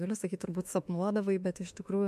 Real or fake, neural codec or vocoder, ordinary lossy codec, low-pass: fake; autoencoder, 48 kHz, 32 numbers a frame, DAC-VAE, trained on Japanese speech; MP3, 64 kbps; 14.4 kHz